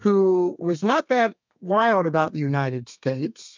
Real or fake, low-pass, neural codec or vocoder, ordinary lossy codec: fake; 7.2 kHz; codec, 32 kHz, 1.9 kbps, SNAC; MP3, 64 kbps